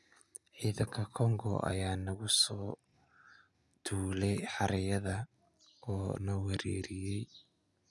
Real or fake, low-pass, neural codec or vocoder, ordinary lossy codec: real; none; none; none